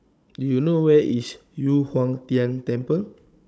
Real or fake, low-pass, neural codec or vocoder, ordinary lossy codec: fake; none; codec, 16 kHz, 16 kbps, FunCodec, trained on Chinese and English, 50 frames a second; none